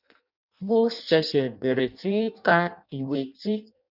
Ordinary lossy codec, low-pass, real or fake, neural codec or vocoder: none; 5.4 kHz; fake; codec, 16 kHz in and 24 kHz out, 0.6 kbps, FireRedTTS-2 codec